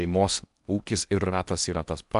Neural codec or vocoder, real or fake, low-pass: codec, 16 kHz in and 24 kHz out, 0.8 kbps, FocalCodec, streaming, 65536 codes; fake; 10.8 kHz